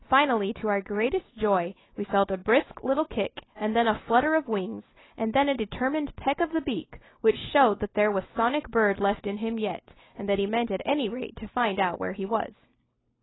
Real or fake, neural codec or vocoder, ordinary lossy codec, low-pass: real; none; AAC, 16 kbps; 7.2 kHz